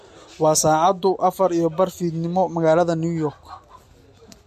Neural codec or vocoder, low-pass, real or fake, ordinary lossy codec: none; 14.4 kHz; real; MP3, 64 kbps